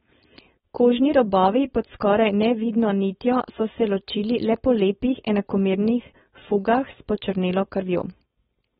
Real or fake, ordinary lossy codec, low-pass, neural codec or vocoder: fake; AAC, 16 kbps; 7.2 kHz; codec, 16 kHz, 4.8 kbps, FACodec